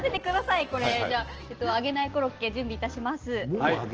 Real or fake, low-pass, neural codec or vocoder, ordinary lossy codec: real; 7.2 kHz; none; Opus, 16 kbps